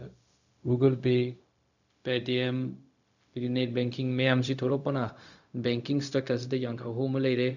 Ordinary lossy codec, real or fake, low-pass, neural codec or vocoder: none; fake; 7.2 kHz; codec, 16 kHz, 0.4 kbps, LongCat-Audio-Codec